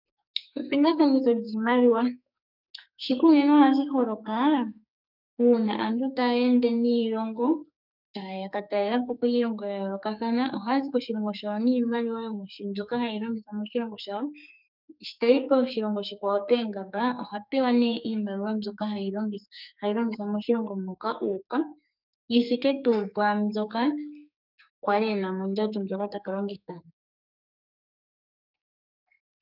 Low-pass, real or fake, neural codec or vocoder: 5.4 kHz; fake; codec, 44.1 kHz, 2.6 kbps, SNAC